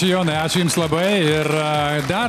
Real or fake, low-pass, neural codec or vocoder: real; 14.4 kHz; none